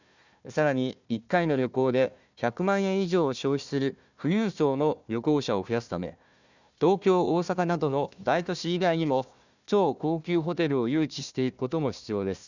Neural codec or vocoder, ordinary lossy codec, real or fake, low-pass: codec, 16 kHz, 1 kbps, FunCodec, trained on Chinese and English, 50 frames a second; none; fake; 7.2 kHz